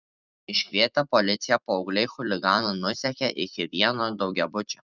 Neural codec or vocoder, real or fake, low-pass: vocoder, 44.1 kHz, 128 mel bands every 256 samples, BigVGAN v2; fake; 7.2 kHz